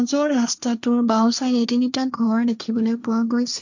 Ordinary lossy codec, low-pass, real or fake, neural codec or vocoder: none; 7.2 kHz; fake; codec, 16 kHz, 1.1 kbps, Voila-Tokenizer